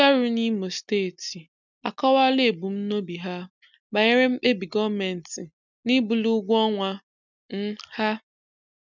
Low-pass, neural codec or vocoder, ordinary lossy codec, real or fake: 7.2 kHz; none; none; real